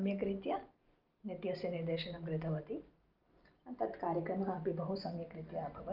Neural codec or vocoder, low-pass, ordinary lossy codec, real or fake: none; 5.4 kHz; Opus, 32 kbps; real